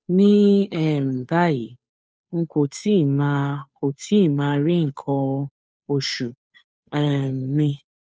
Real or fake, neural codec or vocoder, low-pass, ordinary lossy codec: fake; codec, 16 kHz, 2 kbps, FunCodec, trained on Chinese and English, 25 frames a second; none; none